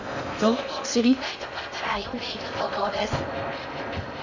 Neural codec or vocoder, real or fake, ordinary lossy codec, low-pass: codec, 16 kHz in and 24 kHz out, 0.6 kbps, FocalCodec, streaming, 4096 codes; fake; none; 7.2 kHz